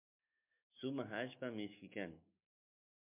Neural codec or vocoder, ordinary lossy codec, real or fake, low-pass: autoencoder, 48 kHz, 128 numbers a frame, DAC-VAE, trained on Japanese speech; MP3, 32 kbps; fake; 3.6 kHz